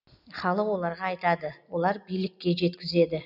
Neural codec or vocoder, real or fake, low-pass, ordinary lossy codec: none; real; 5.4 kHz; none